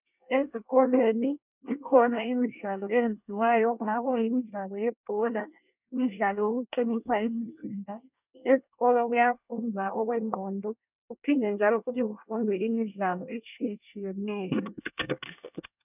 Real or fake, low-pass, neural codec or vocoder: fake; 3.6 kHz; codec, 24 kHz, 1 kbps, SNAC